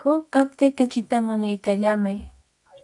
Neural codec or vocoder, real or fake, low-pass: codec, 24 kHz, 0.9 kbps, WavTokenizer, medium music audio release; fake; 10.8 kHz